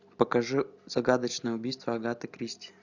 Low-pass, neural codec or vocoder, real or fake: 7.2 kHz; none; real